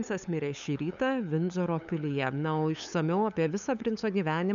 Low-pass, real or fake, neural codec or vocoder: 7.2 kHz; fake; codec, 16 kHz, 8 kbps, FunCodec, trained on LibriTTS, 25 frames a second